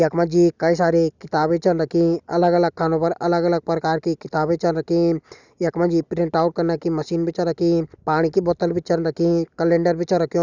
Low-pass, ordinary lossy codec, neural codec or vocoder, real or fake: 7.2 kHz; none; none; real